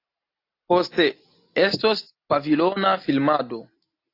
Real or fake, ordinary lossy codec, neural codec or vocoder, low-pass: real; AAC, 32 kbps; none; 5.4 kHz